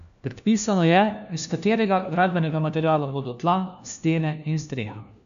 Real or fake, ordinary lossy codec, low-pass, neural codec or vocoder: fake; none; 7.2 kHz; codec, 16 kHz, 1 kbps, FunCodec, trained on LibriTTS, 50 frames a second